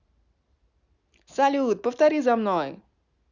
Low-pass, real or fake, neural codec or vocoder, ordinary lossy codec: 7.2 kHz; real; none; none